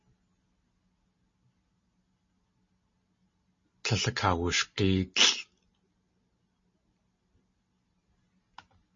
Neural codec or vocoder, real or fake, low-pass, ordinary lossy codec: none; real; 7.2 kHz; MP3, 32 kbps